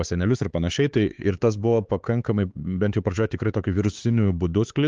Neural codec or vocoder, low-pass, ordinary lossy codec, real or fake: codec, 16 kHz, 4 kbps, X-Codec, HuBERT features, trained on LibriSpeech; 7.2 kHz; Opus, 24 kbps; fake